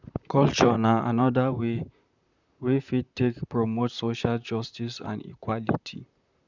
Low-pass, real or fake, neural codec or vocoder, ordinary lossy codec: 7.2 kHz; fake; vocoder, 44.1 kHz, 128 mel bands, Pupu-Vocoder; none